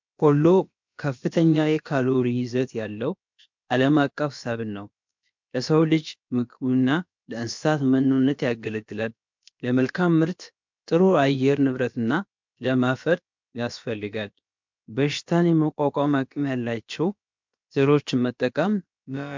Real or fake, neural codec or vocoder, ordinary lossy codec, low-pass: fake; codec, 16 kHz, about 1 kbps, DyCAST, with the encoder's durations; MP3, 64 kbps; 7.2 kHz